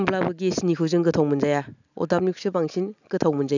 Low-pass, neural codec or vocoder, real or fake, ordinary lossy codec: 7.2 kHz; none; real; none